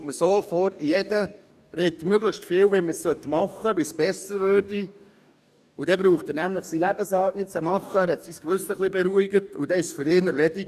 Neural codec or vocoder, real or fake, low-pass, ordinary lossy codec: codec, 44.1 kHz, 2.6 kbps, DAC; fake; 14.4 kHz; none